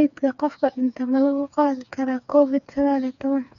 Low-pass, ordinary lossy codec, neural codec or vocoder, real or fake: 7.2 kHz; none; codec, 16 kHz, 4 kbps, FreqCodec, smaller model; fake